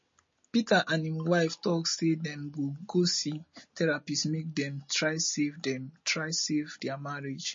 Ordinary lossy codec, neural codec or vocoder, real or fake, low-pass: MP3, 32 kbps; none; real; 7.2 kHz